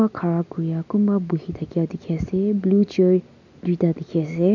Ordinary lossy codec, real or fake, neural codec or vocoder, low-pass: none; real; none; 7.2 kHz